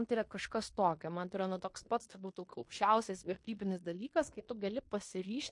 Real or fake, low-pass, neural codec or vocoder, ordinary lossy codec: fake; 10.8 kHz; codec, 16 kHz in and 24 kHz out, 0.9 kbps, LongCat-Audio-Codec, fine tuned four codebook decoder; MP3, 48 kbps